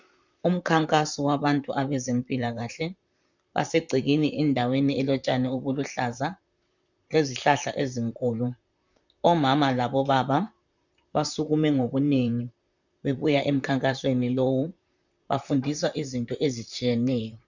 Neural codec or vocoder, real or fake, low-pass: vocoder, 44.1 kHz, 128 mel bands, Pupu-Vocoder; fake; 7.2 kHz